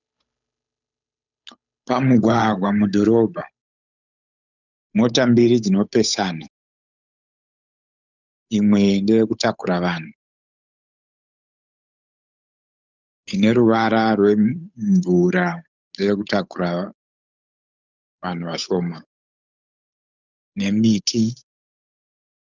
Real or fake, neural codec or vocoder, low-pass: fake; codec, 16 kHz, 8 kbps, FunCodec, trained on Chinese and English, 25 frames a second; 7.2 kHz